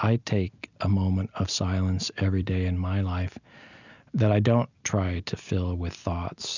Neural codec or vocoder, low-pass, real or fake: none; 7.2 kHz; real